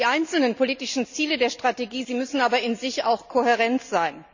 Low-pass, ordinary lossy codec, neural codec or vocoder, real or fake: 7.2 kHz; none; none; real